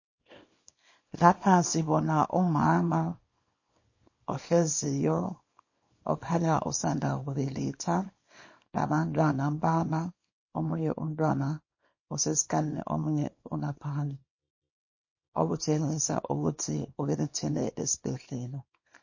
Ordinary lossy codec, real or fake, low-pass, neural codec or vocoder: MP3, 32 kbps; fake; 7.2 kHz; codec, 24 kHz, 0.9 kbps, WavTokenizer, small release